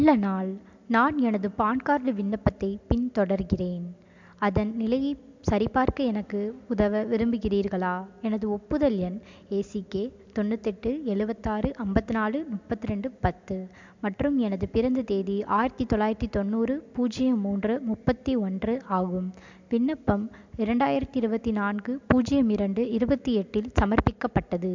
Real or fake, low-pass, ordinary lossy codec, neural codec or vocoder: real; 7.2 kHz; MP3, 64 kbps; none